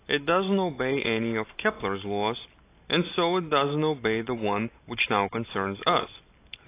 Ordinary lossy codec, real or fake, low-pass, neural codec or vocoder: AAC, 24 kbps; real; 3.6 kHz; none